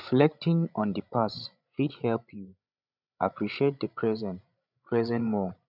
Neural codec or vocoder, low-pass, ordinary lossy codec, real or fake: codec, 16 kHz, 16 kbps, FreqCodec, larger model; 5.4 kHz; none; fake